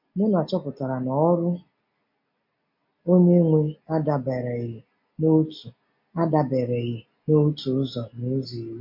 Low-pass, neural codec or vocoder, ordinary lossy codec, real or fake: 5.4 kHz; none; MP3, 32 kbps; real